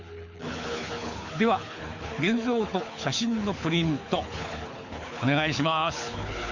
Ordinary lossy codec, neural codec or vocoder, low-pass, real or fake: Opus, 64 kbps; codec, 24 kHz, 6 kbps, HILCodec; 7.2 kHz; fake